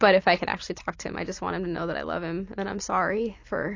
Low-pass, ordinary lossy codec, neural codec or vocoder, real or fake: 7.2 kHz; AAC, 48 kbps; none; real